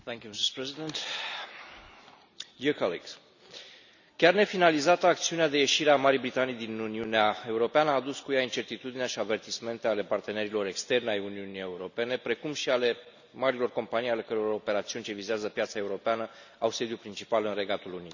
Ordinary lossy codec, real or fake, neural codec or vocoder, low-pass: none; real; none; 7.2 kHz